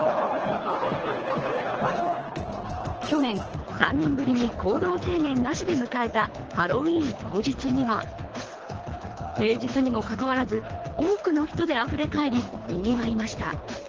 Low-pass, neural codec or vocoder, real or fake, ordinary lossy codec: 7.2 kHz; codec, 24 kHz, 3 kbps, HILCodec; fake; Opus, 16 kbps